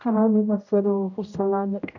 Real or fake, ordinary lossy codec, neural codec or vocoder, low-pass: fake; none; codec, 16 kHz, 0.5 kbps, X-Codec, HuBERT features, trained on general audio; 7.2 kHz